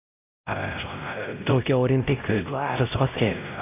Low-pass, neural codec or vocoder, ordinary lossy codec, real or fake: 3.6 kHz; codec, 16 kHz, 0.5 kbps, X-Codec, WavLM features, trained on Multilingual LibriSpeech; AAC, 32 kbps; fake